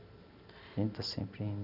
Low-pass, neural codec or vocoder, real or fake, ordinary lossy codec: 5.4 kHz; none; real; none